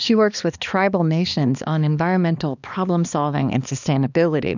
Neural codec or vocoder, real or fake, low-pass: codec, 16 kHz, 2 kbps, X-Codec, HuBERT features, trained on balanced general audio; fake; 7.2 kHz